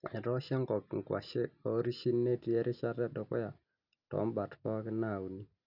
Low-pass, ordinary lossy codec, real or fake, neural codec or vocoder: 5.4 kHz; none; real; none